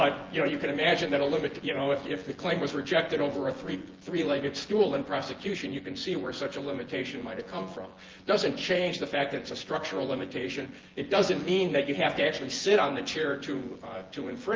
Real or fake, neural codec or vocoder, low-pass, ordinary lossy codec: fake; vocoder, 24 kHz, 100 mel bands, Vocos; 7.2 kHz; Opus, 16 kbps